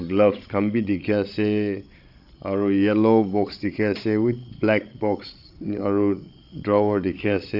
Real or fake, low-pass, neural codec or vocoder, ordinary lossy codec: fake; 5.4 kHz; codec, 16 kHz, 16 kbps, FreqCodec, larger model; Opus, 64 kbps